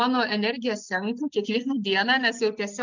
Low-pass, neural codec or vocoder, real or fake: 7.2 kHz; none; real